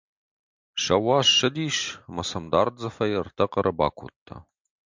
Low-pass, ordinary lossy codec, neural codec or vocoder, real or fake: 7.2 kHz; MP3, 64 kbps; none; real